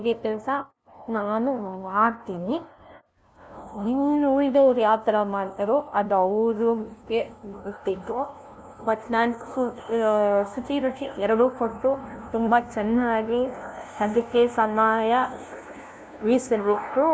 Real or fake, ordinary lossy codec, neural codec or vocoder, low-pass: fake; none; codec, 16 kHz, 0.5 kbps, FunCodec, trained on LibriTTS, 25 frames a second; none